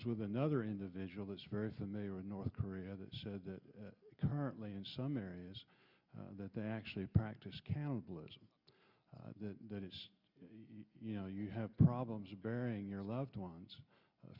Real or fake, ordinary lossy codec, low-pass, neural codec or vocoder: real; Opus, 64 kbps; 5.4 kHz; none